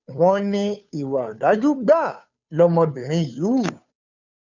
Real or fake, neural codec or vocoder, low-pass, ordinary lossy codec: fake; codec, 16 kHz, 2 kbps, FunCodec, trained on Chinese and English, 25 frames a second; 7.2 kHz; none